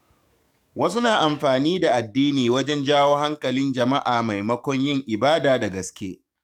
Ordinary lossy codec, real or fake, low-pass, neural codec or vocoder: none; fake; 19.8 kHz; codec, 44.1 kHz, 7.8 kbps, DAC